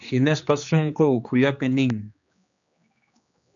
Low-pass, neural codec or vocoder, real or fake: 7.2 kHz; codec, 16 kHz, 2 kbps, X-Codec, HuBERT features, trained on general audio; fake